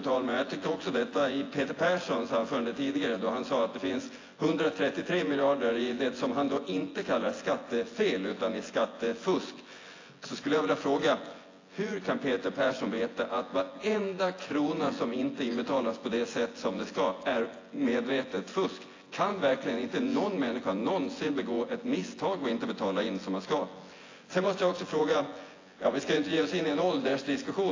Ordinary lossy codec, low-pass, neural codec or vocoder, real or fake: AAC, 32 kbps; 7.2 kHz; vocoder, 24 kHz, 100 mel bands, Vocos; fake